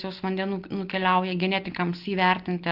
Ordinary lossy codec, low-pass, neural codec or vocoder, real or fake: Opus, 24 kbps; 5.4 kHz; none; real